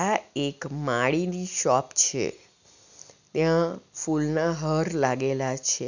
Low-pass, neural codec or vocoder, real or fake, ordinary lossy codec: 7.2 kHz; none; real; none